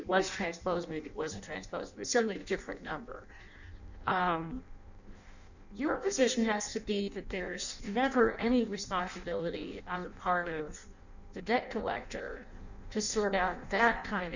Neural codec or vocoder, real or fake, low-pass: codec, 16 kHz in and 24 kHz out, 0.6 kbps, FireRedTTS-2 codec; fake; 7.2 kHz